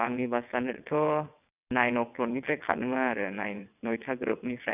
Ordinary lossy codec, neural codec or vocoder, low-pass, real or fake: none; vocoder, 22.05 kHz, 80 mel bands, WaveNeXt; 3.6 kHz; fake